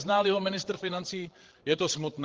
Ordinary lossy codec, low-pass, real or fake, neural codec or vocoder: Opus, 16 kbps; 7.2 kHz; fake; codec, 16 kHz, 8 kbps, FreqCodec, larger model